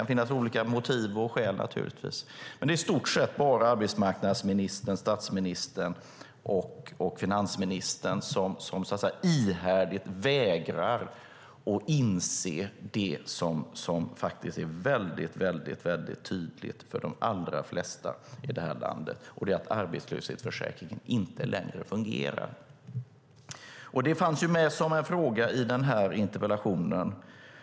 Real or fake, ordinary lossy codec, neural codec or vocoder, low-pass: real; none; none; none